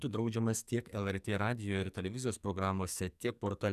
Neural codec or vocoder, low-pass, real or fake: codec, 44.1 kHz, 2.6 kbps, SNAC; 14.4 kHz; fake